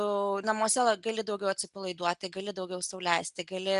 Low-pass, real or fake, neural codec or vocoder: 10.8 kHz; real; none